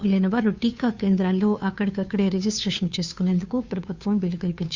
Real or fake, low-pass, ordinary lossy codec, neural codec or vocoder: fake; 7.2 kHz; Opus, 64 kbps; codec, 16 kHz, 2 kbps, FunCodec, trained on Chinese and English, 25 frames a second